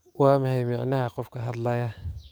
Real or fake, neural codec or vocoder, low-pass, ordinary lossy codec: fake; codec, 44.1 kHz, 7.8 kbps, DAC; none; none